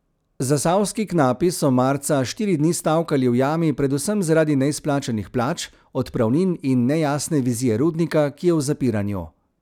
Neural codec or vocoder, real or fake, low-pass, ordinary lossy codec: none; real; 19.8 kHz; none